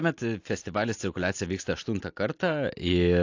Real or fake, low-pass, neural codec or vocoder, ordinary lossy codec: real; 7.2 kHz; none; AAC, 48 kbps